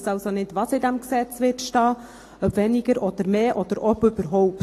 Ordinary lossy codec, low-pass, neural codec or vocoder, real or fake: AAC, 48 kbps; 14.4 kHz; none; real